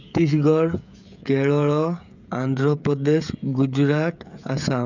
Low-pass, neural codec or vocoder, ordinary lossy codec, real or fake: 7.2 kHz; codec, 16 kHz, 16 kbps, FreqCodec, smaller model; none; fake